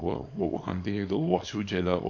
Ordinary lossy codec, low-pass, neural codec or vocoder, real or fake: none; 7.2 kHz; codec, 24 kHz, 0.9 kbps, WavTokenizer, small release; fake